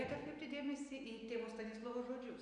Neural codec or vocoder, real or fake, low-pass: none; real; 9.9 kHz